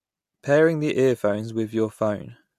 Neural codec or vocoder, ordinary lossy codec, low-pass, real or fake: none; MP3, 64 kbps; 14.4 kHz; real